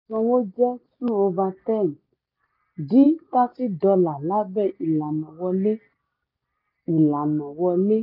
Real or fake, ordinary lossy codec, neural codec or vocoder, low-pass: real; none; none; 5.4 kHz